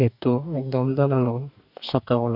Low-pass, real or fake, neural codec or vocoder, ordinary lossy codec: 5.4 kHz; fake; codec, 44.1 kHz, 2.6 kbps, DAC; none